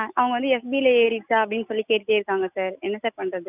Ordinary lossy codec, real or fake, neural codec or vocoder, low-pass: none; real; none; 3.6 kHz